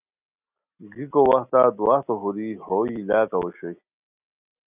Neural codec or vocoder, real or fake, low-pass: none; real; 3.6 kHz